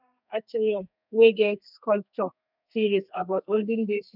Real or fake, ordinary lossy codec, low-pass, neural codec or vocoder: fake; none; 5.4 kHz; codec, 32 kHz, 1.9 kbps, SNAC